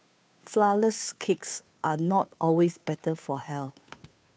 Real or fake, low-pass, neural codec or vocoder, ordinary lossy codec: fake; none; codec, 16 kHz, 2 kbps, FunCodec, trained on Chinese and English, 25 frames a second; none